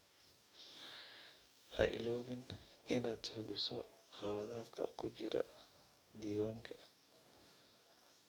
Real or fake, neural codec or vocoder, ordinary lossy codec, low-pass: fake; codec, 44.1 kHz, 2.6 kbps, DAC; none; none